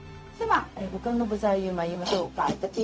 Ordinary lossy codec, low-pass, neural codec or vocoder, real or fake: none; none; codec, 16 kHz, 0.4 kbps, LongCat-Audio-Codec; fake